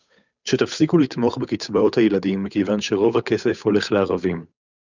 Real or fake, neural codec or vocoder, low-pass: fake; codec, 16 kHz, 8 kbps, FunCodec, trained on Chinese and English, 25 frames a second; 7.2 kHz